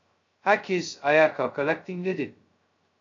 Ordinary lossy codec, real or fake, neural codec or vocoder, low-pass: AAC, 48 kbps; fake; codec, 16 kHz, 0.2 kbps, FocalCodec; 7.2 kHz